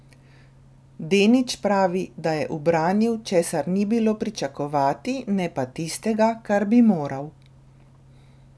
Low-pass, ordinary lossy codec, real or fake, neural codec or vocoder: none; none; real; none